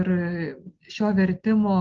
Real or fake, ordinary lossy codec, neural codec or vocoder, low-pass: real; Opus, 16 kbps; none; 7.2 kHz